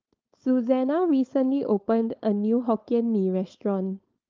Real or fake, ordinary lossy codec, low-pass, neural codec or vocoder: fake; Opus, 24 kbps; 7.2 kHz; codec, 16 kHz, 4.8 kbps, FACodec